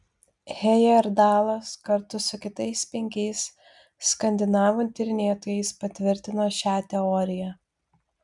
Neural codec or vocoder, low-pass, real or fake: none; 10.8 kHz; real